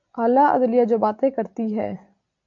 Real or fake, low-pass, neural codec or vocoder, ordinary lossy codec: real; 7.2 kHz; none; AAC, 64 kbps